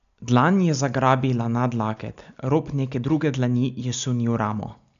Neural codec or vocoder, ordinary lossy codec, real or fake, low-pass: none; none; real; 7.2 kHz